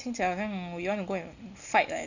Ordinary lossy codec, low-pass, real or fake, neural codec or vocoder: none; 7.2 kHz; real; none